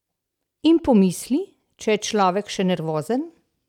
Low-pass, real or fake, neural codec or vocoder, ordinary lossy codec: 19.8 kHz; real; none; none